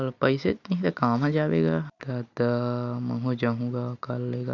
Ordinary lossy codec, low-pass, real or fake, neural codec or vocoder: none; 7.2 kHz; real; none